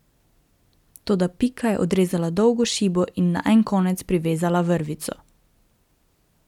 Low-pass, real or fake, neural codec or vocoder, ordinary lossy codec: 19.8 kHz; real; none; none